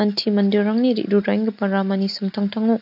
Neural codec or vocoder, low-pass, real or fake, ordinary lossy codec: none; 5.4 kHz; real; AAC, 48 kbps